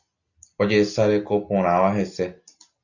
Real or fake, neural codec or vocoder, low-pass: real; none; 7.2 kHz